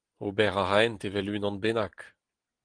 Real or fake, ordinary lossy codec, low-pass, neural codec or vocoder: real; Opus, 24 kbps; 9.9 kHz; none